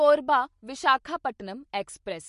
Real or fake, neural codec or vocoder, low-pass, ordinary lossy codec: real; none; 14.4 kHz; MP3, 48 kbps